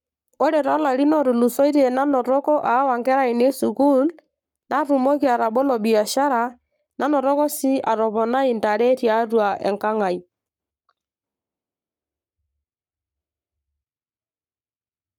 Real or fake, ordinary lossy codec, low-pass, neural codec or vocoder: fake; none; 19.8 kHz; codec, 44.1 kHz, 7.8 kbps, Pupu-Codec